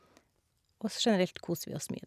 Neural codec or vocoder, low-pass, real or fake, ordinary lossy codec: none; 14.4 kHz; real; none